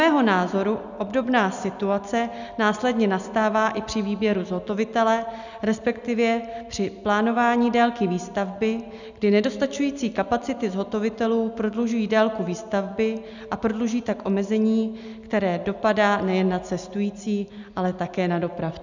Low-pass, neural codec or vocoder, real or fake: 7.2 kHz; none; real